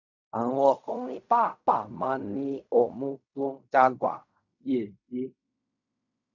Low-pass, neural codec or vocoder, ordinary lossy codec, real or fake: 7.2 kHz; codec, 16 kHz in and 24 kHz out, 0.4 kbps, LongCat-Audio-Codec, fine tuned four codebook decoder; none; fake